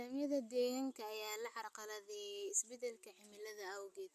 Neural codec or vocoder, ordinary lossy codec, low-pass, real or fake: none; MP3, 64 kbps; 14.4 kHz; real